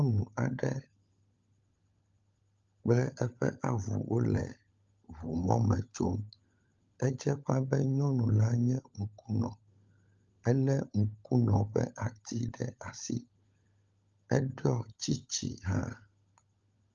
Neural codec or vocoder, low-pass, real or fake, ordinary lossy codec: codec, 16 kHz, 16 kbps, FunCodec, trained on LibriTTS, 50 frames a second; 7.2 kHz; fake; Opus, 24 kbps